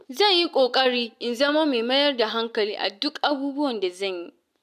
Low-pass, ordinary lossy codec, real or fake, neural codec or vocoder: 14.4 kHz; none; real; none